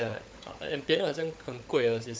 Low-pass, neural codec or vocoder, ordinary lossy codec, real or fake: none; codec, 16 kHz, 16 kbps, FunCodec, trained on LibriTTS, 50 frames a second; none; fake